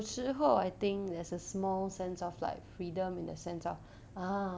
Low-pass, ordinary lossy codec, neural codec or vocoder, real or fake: none; none; none; real